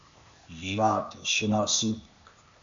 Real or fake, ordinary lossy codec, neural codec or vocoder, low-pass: fake; MP3, 64 kbps; codec, 16 kHz, 0.8 kbps, ZipCodec; 7.2 kHz